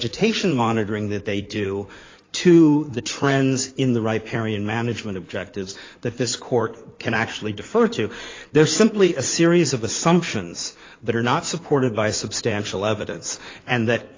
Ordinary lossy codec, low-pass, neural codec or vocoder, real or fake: AAC, 32 kbps; 7.2 kHz; codec, 16 kHz in and 24 kHz out, 2.2 kbps, FireRedTTS-2 codec; fake